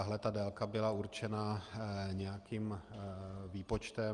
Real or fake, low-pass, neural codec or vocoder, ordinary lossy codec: real; 10.8 kHz; none; Opus, 32 kbps